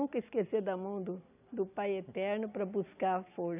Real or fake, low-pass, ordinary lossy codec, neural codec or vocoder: real; 3.6 kHz; none; none